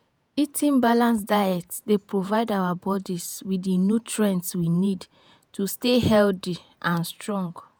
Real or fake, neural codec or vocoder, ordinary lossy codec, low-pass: fake; vocoder, 48 kHz, 128 mel bands, Vocos; none; none